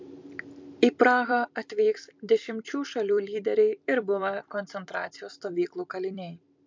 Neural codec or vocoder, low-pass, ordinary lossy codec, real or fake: vocoder, 44.1 kHz, 128 mel bands every 256 samples, BigVGAN v2; 7.2 kHz; MP3, 64 kbps; fake